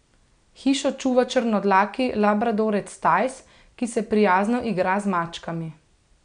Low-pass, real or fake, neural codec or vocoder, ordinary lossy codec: 9.9 kHz; real; none; none